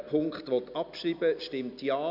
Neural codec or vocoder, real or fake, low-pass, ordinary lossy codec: none; real; 5.4 kHz; none